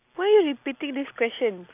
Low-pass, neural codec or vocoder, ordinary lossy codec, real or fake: 3.6 kHz; none; none; real